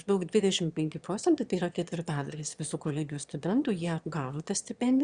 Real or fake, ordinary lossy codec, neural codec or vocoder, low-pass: fake; Opus, 64 kbps; autoencoder, 22.05 kHz, a latent of 192 numbers a frame, VITS, trained on one speaker; 9.9 kHz